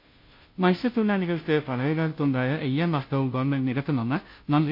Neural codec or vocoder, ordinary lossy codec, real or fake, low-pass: codec, 16 kHz, 0.5 kbps, FunCodec, trained on Chinese and English, 25 frames a second; MP3, 32 kbps; fake; 5.4 kHz